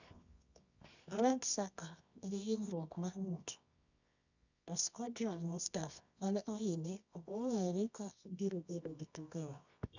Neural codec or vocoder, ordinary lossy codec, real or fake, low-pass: codec, 24 kHz, 0.9 kbps, WavTokenizer, medium music audio release; none; fake; 7.2 kHz